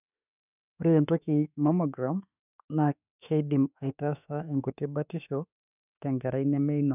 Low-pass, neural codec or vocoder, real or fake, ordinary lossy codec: 3.6 kHz; autoencoder, 48 kHz, 32 numbers a frame, DAC-VAE, trained on Japanese speech; fake; none